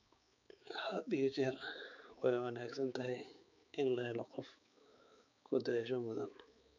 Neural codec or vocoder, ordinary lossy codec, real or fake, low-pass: codec, 16 kHz, 4 kbps, X-Codec, HuBERT features, trained on balanced general audio; none; fake; 7.2 kHz